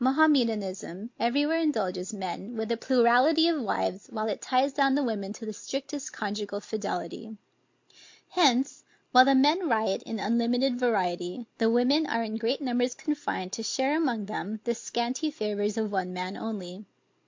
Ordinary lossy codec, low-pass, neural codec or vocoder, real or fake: MP3, 48 kbps; 7.2 kHz; none; real